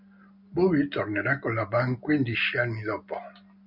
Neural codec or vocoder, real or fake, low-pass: none; real; 5.4 kHz